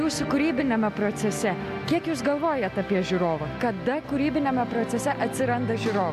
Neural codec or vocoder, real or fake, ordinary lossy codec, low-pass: none; real; AAC, 96 kbps; 14.4 kHz